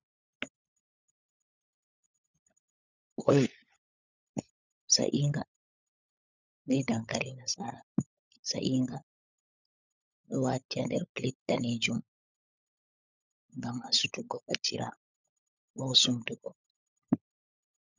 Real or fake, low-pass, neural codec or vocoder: fake; 7.2 kHz; codec, 16 kHz, 16 kbps, FunCodec, trained on LibriTTS, 50 frames a second